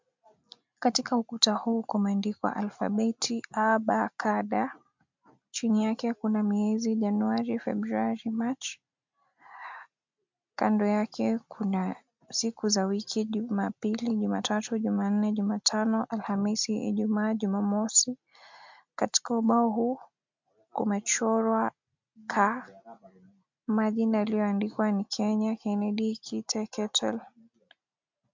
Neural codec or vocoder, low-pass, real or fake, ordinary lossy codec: none; 7.2 kHz; real; MP3, 64 kbps